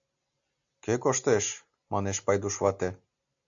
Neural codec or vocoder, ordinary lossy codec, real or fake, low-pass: none; AAC, 64 kbps; real; 7.2 kHz